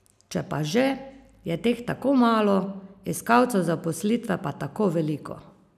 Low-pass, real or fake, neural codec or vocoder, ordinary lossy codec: 14.4 kHz; real; none; none